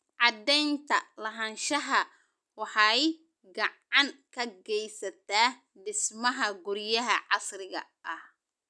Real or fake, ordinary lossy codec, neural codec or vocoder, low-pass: real; none; none; none